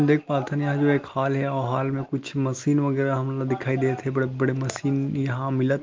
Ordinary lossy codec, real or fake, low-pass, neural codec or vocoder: Opus, 32 kbps; real; 7.2 kHz; none